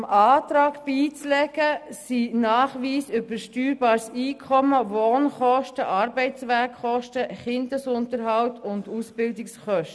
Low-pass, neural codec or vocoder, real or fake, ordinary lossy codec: none; none; real; none